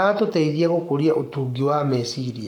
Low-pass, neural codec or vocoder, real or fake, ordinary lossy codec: 19.8 kHz; codec, 44.1 kHz, 7.8 kbps, Pupu-Codec; fake; none